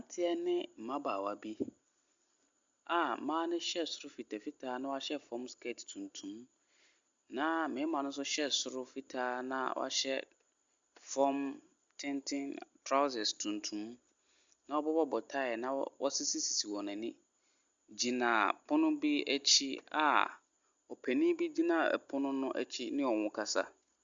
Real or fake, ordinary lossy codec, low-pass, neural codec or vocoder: real; Opus, 64 kbps; 7.2 kHz; none